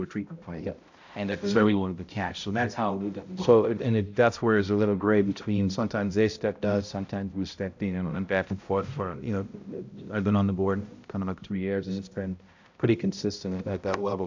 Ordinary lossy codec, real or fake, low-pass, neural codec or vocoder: AAC, 48 kbps; fake; 7.2 kHz; codec, 16 kHz, 0.5 kbps, X-Codec, HuBERT features, trained on balanced general audio